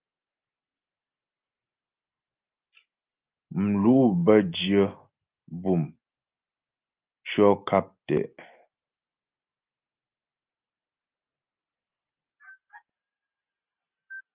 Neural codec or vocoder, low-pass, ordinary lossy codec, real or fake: none; 3.6 kHz; Opus, 32 kbps; real